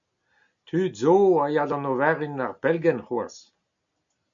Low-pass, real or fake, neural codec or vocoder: 7.2 kHz; real; none